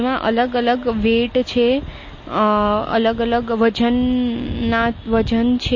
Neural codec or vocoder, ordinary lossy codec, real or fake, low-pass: none; MP3, 32 kbps; real; 7.2 kHz